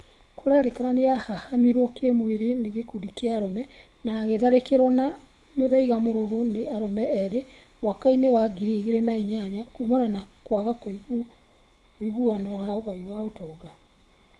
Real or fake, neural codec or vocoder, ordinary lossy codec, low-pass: fake; codec, 24 kHz, 6 kbps, HILCodec; none; none